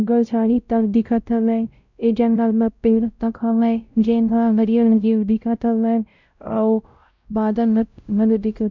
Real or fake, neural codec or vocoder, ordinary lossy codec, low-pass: fake; codec, 16 kHz, 0.5 kbps, X-Codec, WavLM features, trained on Multilingual LibriSpeech; MP3, 64 kbps; 7.2 kHz